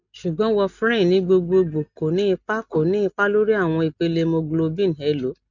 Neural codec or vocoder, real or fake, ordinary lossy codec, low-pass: none; real; none; 7.2 kHz